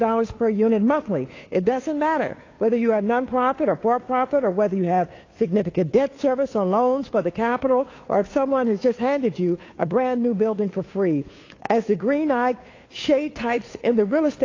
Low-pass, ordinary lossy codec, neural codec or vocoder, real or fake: 7.2 kHz; AAC, 32 kbps; codec, 16 kHz, 2 kbps, FunCodec, trained on Chinese and English, 25 frames a second; fake